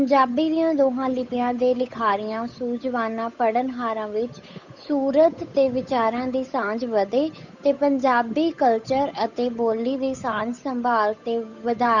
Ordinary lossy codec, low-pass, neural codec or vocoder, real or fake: none; 7.2 kHz; codec, 16 kHz, 8 kbps, FunCodec, trained on Chinese and English, 25 frames a second; fake